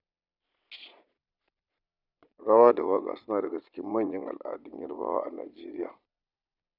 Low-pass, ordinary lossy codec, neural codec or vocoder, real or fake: 5.4 kHz; none; vocoder, 22.05 kHz, 80 mel bands, Vocos; fake